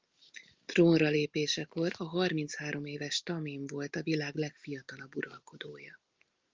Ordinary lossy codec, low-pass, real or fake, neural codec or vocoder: Opus, 32 kbps; 7.2 kHz; real; none